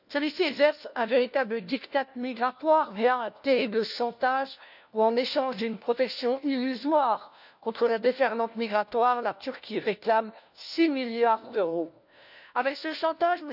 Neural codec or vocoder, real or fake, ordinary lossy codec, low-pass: codec, 16 kHz, 1 kbps, FunCodec, trained on LibriTTS, 50 frames a second; fake; none; 5.4 kHz